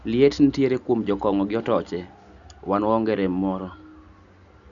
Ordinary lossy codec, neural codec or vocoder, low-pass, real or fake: none; none; 7.2 kHz; real